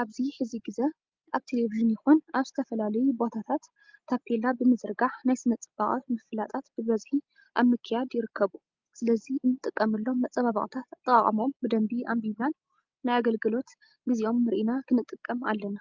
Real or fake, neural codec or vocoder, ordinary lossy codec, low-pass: real; none; Opus, 24 kbps; 7.2 kHz